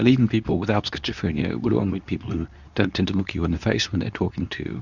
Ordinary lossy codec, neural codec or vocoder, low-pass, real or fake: Opus, 64 kbps; codec, 24 kHz, 0.9 kbps, WavTokenizer, medium speech release version 2; 7.2 kHz; fake